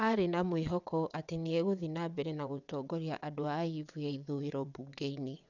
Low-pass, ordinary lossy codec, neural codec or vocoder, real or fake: 7.2 kHz; none; vocoder, 22.05 kHz, 80 mel bands, WaveNeXt; fake